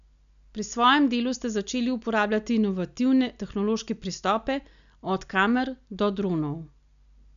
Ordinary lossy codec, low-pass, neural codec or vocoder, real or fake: none; 7.2 kHz; none; real